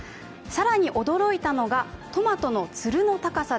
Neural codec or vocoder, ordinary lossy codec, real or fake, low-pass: none; none; real; none